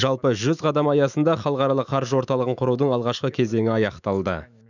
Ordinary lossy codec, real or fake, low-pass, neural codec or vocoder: none; fake; 7.2 kHz; autoencoder, 48 kHz, 128 numbers a frame, DAC-VAE, trained on Japanese speech